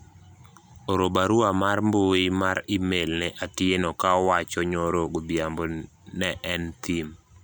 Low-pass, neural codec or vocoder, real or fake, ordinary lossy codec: none; none; real; none